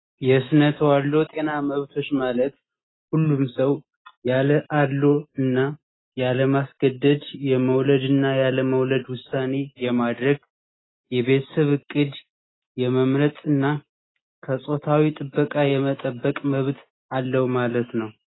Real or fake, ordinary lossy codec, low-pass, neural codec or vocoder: real; AAC, 16 kbps; 7.2 kHz; none